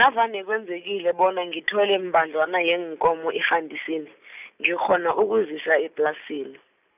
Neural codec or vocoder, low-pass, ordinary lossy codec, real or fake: none; 3.6 kHz; none; real